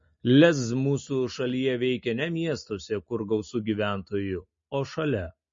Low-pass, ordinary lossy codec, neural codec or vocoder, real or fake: 7.2 kHz; MP3, 32 kbps; none; real